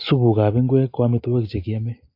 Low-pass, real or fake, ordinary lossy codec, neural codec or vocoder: 5.4 kHz; real; AAC, 32 kbps; none